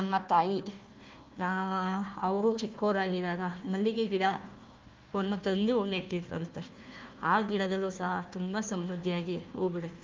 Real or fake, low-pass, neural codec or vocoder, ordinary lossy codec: fake; 7.2 kHz; codec, 16 kHz, 1 kbps, FunCodec, trained on Chinese and English, 50 frames a second; Opus, 32 kbps